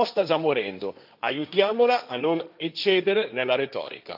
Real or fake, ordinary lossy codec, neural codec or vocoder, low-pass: fake; none; codec, 16 kHz, 1.1 kbps, Voila-Tokenizer; 5.4 kHz